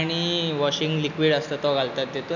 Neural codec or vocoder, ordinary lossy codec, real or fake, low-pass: none; none; real; 7.2 kHz